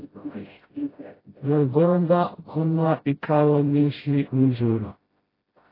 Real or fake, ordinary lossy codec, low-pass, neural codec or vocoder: fake; AAC, 24 kbps; 5.4 kHz; codec, 16 kHz, 0.5 kbps, FreqCodec, smaller model